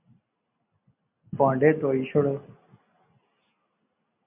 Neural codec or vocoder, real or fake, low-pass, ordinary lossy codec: none; real; 3.6 kHz; MP3, 32 kbps